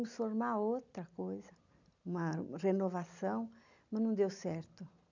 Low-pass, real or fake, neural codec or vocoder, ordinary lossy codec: 7.2 kHz; real; none; none